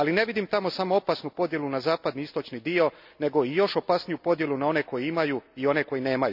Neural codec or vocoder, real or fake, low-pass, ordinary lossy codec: none; real; 5.4 kHz; none